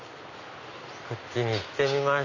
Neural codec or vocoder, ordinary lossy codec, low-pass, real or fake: none; none; 7.2 kHz; real